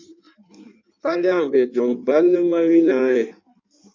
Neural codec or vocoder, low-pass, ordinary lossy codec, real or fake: codec, 16 kHz in and 24 kHz out, 1.1 kbps, FireRedTTS-2 codec; 7.2 kHz; MP3, 64 kbps; fake